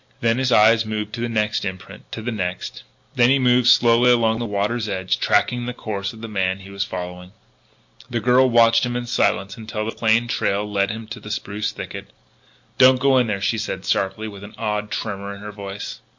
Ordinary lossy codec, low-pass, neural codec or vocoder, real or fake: MP3, 48 kbps; 7.2 kHz; none; real